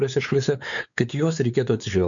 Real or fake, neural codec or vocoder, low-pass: fake; codec, 16 kHz, 4 kbps, X-Codec, WavLM features, trained on Multilingual LibriSpeech; 7.2 kHz